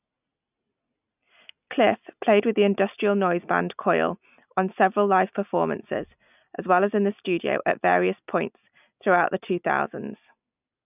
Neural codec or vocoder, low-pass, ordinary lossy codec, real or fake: none; 3.6 kHz; none; real